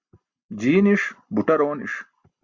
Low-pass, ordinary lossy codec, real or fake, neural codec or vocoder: 7.2 kHz; Opus, 64 kbps; real; none